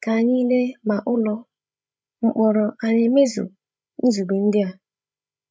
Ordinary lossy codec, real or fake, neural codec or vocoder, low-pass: none; fake; codec, 16 kHz, 16 kbps, FreqCodec, larger model; none